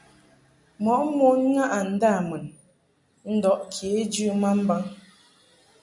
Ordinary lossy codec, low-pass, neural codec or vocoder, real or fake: MP3, 96 kbps; 10.8 kHz; none; real